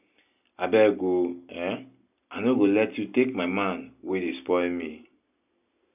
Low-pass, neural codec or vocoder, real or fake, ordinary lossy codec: 3.6 kHz; none; real; none